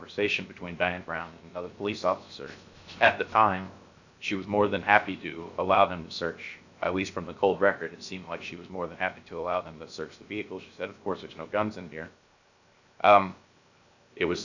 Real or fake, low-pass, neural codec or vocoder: fake; 7.2 kHz; codec, 16 kHz, 0.7 kbps, FocalCodec